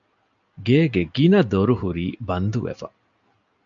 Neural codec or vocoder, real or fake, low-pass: none; real; 7.2 kHz